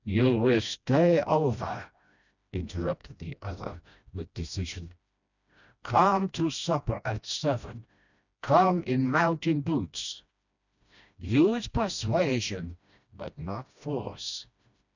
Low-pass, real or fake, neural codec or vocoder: 7.2 kHz; fake; codec, 16 kHz, 1 kbps, FreqCodec, smaller model